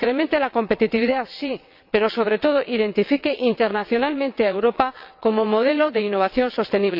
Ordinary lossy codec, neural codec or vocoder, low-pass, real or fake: MP3, 48 kbps; vocoder, 22.05 kHz, 80 mel bands, WaveNeXt; 5.4 kHz; fake